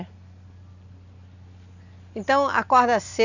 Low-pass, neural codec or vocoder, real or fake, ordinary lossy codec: 7.2 kHz; none; real; none